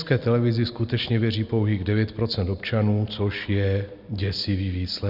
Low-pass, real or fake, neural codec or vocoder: 5.4 kHz; real; none